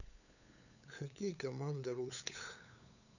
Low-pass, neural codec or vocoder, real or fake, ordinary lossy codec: 7.2 kHz; codec, 16 kHz, 8 kbps, FunCodec, trained on LibriTTS, 25 frames a second; fake; none